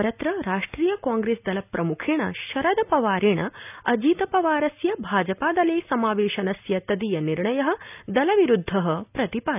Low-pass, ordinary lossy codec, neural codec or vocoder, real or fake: 3.6 kHz; none; none; real